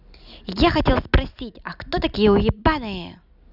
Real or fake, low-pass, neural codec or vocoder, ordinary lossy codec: real; 5.4 kHz; none; none